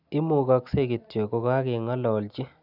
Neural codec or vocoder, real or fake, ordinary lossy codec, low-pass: none; real; none; 5.4 kHz